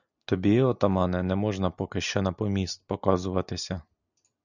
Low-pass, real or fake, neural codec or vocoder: 7.2 kHz; real; none